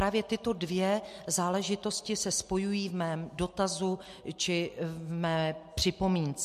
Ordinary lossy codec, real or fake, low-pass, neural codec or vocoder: MP3, 64 kbps; real; 14.4 kHz; none